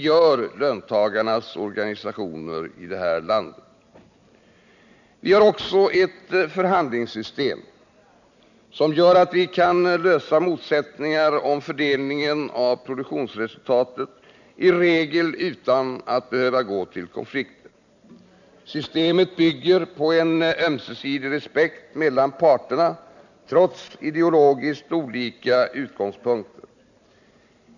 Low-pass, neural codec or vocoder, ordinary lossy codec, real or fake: 7.2 kHz; none; none; real